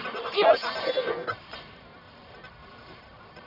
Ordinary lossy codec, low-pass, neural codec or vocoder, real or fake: none; 5.4 kHz; codec, 44.1 kHz, 1.7 kbps, Pupu-Codec; fake